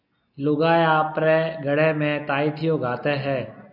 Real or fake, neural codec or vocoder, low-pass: real; none; 5.4 kHz